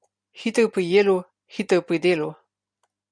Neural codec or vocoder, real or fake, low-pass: none; real; 9.9 kHz